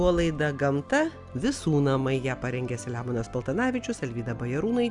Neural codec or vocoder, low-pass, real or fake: none; 10.8 kHz; real